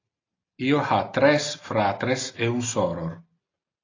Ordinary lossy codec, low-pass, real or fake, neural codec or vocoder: AAC, 32 kbps; 7.2 kHz; real; none